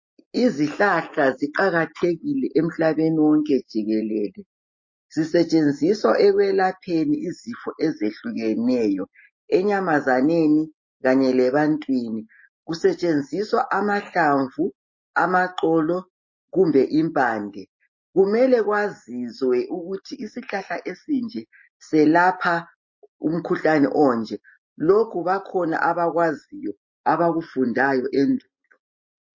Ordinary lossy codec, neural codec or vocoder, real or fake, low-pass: MP3, 32 kbps; none; real; 7.2 kHz